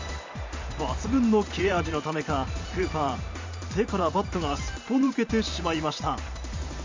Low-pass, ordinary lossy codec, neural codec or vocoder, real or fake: 7.2 kHz; MP3, 64 kbps; vocoder, 44.1 kHz, 128 mel bands every 512 samples, BigVGAN v2; fake